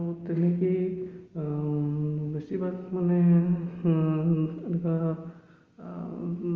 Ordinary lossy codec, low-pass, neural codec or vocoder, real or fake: Opus, 32 kbps; 7.2 kHz; none; real